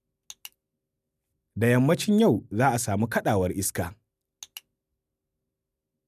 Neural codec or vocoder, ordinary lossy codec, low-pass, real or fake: none; none; 14.4 kHz; real